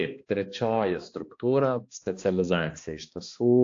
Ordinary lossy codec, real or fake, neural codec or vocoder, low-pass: AAC, 48 kbps; fake; codec, 16 kHz, 2 kbps, X-Codec, HuBERT features, trained on balanced general audio; 7.2 kHz